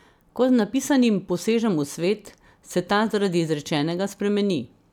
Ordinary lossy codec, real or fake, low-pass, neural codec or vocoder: none; real; 19.8 kHz; none